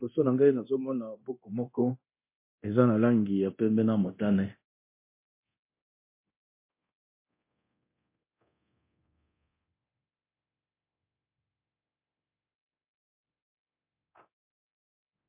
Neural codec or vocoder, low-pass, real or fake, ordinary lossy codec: codec, 24 kHz, 0.9 kbps, DualCodec; 3.6 kHz; fake; MP3, 24 kbps